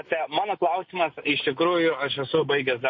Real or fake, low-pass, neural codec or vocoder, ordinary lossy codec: real; 7.2 kHz; none; MP3, 32 kbps